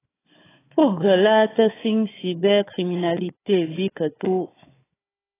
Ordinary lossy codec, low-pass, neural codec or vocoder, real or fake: AAC, 16 kbps; 3.6 kHz; codec, 16 kHz, 4 kbps, FunCodec, trained on Chinese and English, 50 frames a second; fake